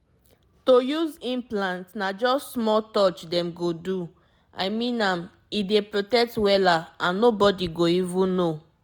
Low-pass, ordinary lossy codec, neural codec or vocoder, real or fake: none; none; none; real